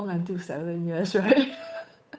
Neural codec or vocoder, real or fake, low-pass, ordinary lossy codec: codec, 16 kHz, 8 kbps, FunCodec, trained on Chinese and English, 25 frames a second; fake; none; none